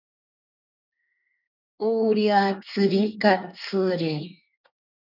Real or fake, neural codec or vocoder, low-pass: fake; codec, 24 kHz, 1 kbps, SNAC; 5.4 kHz